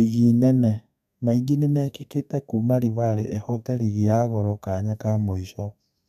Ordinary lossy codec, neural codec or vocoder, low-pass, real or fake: MP3, 96 kbps; codec, 32 kHz, 1.9 kbps, SNAC; 14.4 kHz; fake